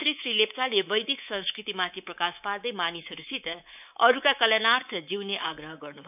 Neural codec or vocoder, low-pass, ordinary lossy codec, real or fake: codec, 24 kHz, 3.1 kbps, DualCodec; 3.6 kHz; none; fake